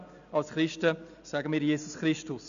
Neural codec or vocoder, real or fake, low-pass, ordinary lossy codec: none; real; 7.2 kHz; MP3, 96 kbps